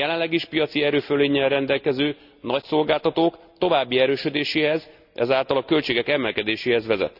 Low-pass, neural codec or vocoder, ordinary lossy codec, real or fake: 5.4 kHz; none; none; real